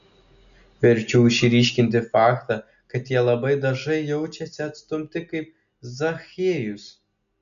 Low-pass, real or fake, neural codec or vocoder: 7.2 kHz; real; none